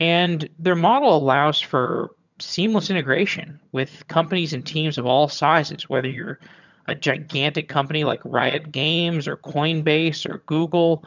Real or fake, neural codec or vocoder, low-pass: fake; vocoder, 22.05 kHz, 80 mel bands, HiFi-GAN; 7.2 kHz